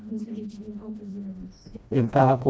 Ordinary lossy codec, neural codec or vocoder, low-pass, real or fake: none; codec, 16 kHz, 1 kbps, FreqCodec, smaller model; none; fake